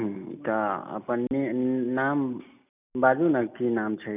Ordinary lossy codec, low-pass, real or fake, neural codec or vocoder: none; 3.6 kHz; real; none